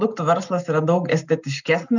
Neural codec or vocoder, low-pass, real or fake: none; 7.2 kHz; real